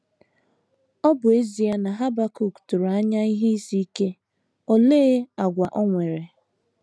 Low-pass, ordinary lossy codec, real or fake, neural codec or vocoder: none; none; real; none